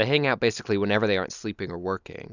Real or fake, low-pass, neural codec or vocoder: real; 7.2 kHz; none